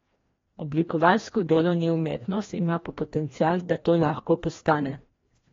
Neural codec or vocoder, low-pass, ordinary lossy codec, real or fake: codec, 16 kHz, 1 kbps, FreqCodec, larger model; 7.2 kHz; AAC, 32 kbps; fake